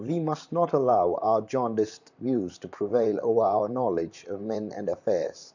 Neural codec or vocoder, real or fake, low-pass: vocoder, 44.1 kHz, 128 mel bands, Pupu-Vocoder; fake; 7.2 kHz